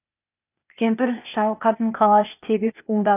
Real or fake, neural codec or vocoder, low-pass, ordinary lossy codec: fake; codec, 16 kHz, 0.8 kbps, ZipCodec; 3.6 kHz; none